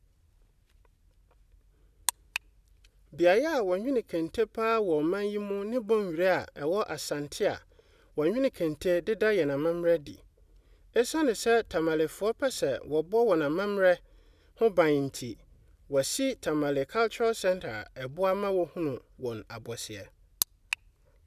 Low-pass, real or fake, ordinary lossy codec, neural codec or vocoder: 14.4 kHz; real; none; none